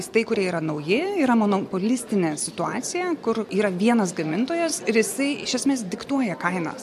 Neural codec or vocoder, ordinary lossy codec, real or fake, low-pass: none; MP3, 64 kbps; real; 14.4 kHz